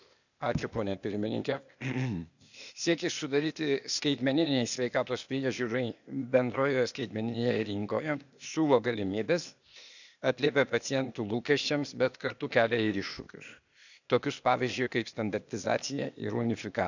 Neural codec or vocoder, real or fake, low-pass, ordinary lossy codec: codec, 16 kHz, 0.8 kbps, ZipCodec; fake; 7.2 kHz; none